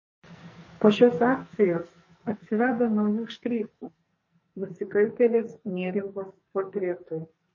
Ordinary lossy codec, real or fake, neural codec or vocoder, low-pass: MP3, 32 kbps; fake; codec, 44.1 kHz, 2.6 kbps, SNAC; 7.2 kHz